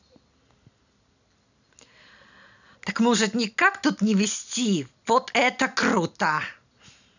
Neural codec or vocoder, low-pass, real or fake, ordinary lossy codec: none; 7.2 kHz; real; none